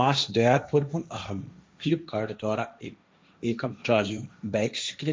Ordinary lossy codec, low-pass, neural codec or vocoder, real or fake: none; none; codec, 16 kHz, 1.1 kbps, Voila-Tokenizer; fake